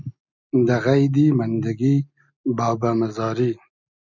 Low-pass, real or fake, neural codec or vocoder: 7.2 kHz; real; none